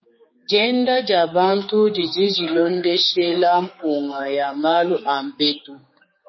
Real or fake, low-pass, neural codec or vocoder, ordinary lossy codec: fake; 7.2 kHz; codec, 16 kHz, 4 kbps, X-Codec, HuBERT features, trained on general audio; MP3, 24 kbps